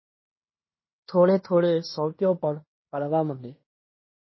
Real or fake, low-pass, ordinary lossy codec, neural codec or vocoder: fake; 7.2 kHz; MP3, 24 kbps; codec, 16 kHz in and 24 kHz out, 0.9 kbps, LongCat-Audio-Codec, fine tuned four codebook decoder